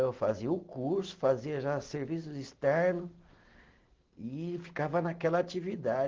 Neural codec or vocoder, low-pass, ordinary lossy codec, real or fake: none; 7.2 kHz; Opus, 16 kbps; real